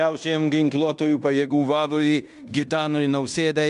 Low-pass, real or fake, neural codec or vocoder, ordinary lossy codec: 10.8 kHz; fake; codec, 16 kHz in and 24 kHz out, 0.9 kbps, LongCat-Audio-Codec, fine tuned four codebook decoder; MP3, 96 kbps